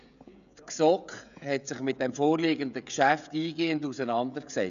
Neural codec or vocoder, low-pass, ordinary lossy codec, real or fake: codec, 16 kHz, 16 kbps, FreqCodec, smaller model; 7.2 kHz; none; fake